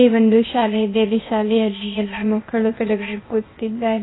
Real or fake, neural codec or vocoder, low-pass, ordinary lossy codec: fake; codec, 16 kHz, 0.8 kbps, ZipCodec; 7.2 kHz; AAC, 16 kbps